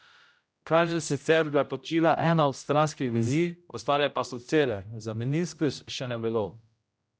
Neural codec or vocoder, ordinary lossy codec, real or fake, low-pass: codec, 16 kHz, 0.5 kbps, X-Codec, HuBERT features, trained on general audio; none; fake; none